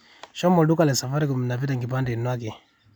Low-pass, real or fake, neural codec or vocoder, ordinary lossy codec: 19.8 kHz; real; none; none